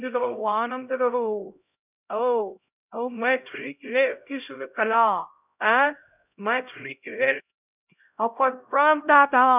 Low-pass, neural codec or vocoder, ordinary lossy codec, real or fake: 3.6 kHz; codec, 16 kHz, 0.5 kbps, X-Codec, HuBERT features, trained on LibriSpeech; none; fake